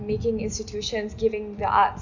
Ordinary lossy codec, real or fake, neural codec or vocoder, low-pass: none; real; none; 7.2 kHz